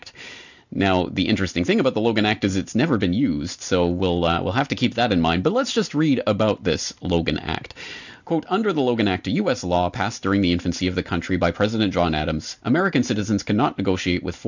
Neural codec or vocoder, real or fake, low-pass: none; real; 7.2 kHz